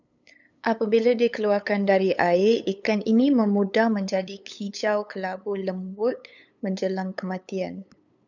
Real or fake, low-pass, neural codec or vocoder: fake; 7.2 kHz; codec, 16 kHz, 8 kbps, FunCodec, trained on LibriTTS, 25 frames a second